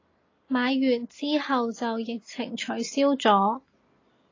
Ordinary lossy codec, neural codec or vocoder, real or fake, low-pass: AAC, 32 kbps; none; real; 7.2 kHz